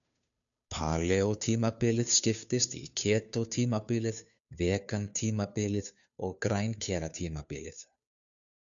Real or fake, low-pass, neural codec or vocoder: fake; 7.2 kHz; codec, 16 kHz, 2 kbps, FunCodec, trained on Chinese and English, 25 frames a second